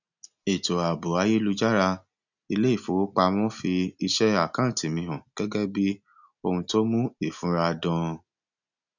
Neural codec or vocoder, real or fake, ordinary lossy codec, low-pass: none; real; none; 7.2 kHz